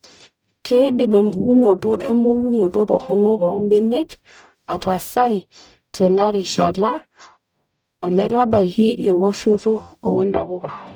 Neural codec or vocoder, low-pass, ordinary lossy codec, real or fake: codec, 44.1 kHz, 0.9 kbps, DAC; none; none; fake